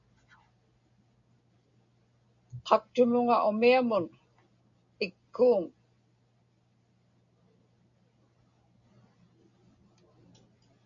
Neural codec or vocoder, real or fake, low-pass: none; real; 7.2 kHz